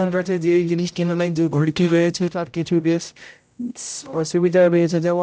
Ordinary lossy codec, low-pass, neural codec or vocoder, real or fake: none; none; codec, 16 kHz, 0.5 kbps, X-Codec, HuBERT features, trained on general audio; fake